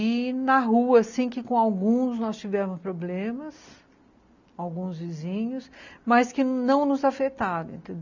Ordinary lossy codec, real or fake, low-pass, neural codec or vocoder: none; real; 7.2 kHz; none